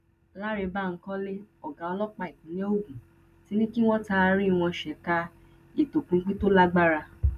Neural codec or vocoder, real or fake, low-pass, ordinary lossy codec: none; real; 14.4 kHz; none